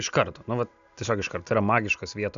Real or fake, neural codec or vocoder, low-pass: real; none; 7.2 kHz